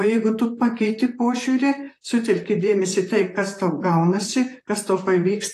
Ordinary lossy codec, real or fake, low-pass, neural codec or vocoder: AAC, 48 kbps; fake; 14.4 kHz; vocoder, 44.1 kHz, 128 mel bands, Pupu-Vocoder